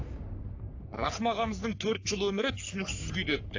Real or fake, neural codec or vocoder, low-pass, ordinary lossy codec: fake; codec, 44.1 kHz, 3.4 kbps, Pupu-Codec; 7.2 kHz; AAC, 48 kbps